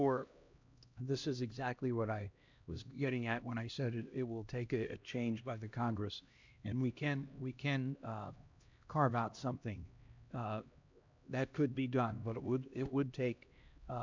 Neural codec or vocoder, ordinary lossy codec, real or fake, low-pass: codec, 16 kHz, 1 kbps, X-Codec, HuBERT features, trained on LibriSpeech; MP3, 64 kbps; fake; 7.2 kHz